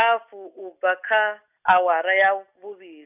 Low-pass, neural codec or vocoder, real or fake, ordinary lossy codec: 3.6 kHz; none; real; none